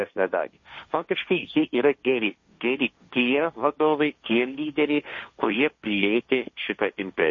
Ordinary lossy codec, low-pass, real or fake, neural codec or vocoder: MP3, 32 kbps; 7.2 kHz; fake; codec, 16 kHz, 1.1 kbps, Voila-Tokenizer